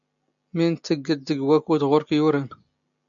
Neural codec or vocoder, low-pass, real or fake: none; 7.2 kHz; real